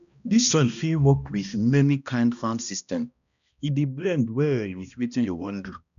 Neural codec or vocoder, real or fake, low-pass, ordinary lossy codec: codec, 16 kHz, 1 kbps, X-Codec, HuBERT features, trained on balanced general audio; fake; 7.2 kHz; none